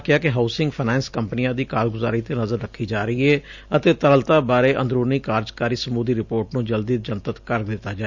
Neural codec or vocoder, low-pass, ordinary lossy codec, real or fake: none; 7.2 kHz; none; real